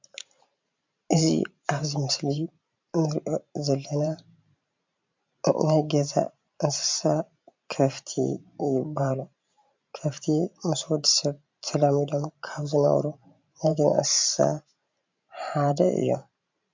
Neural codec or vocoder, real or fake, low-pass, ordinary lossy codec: vocoder, 44.1 kHz, 128 mel bands every 512 samples, BigVGAN v2; fake; 7.2 kHz; MP3, 64 kbps